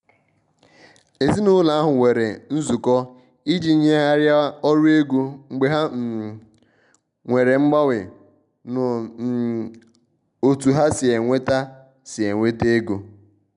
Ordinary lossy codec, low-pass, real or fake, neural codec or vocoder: none; 10.8 kHz; real; none